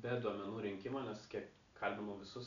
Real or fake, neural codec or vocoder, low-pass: real; none; 7.2 kHz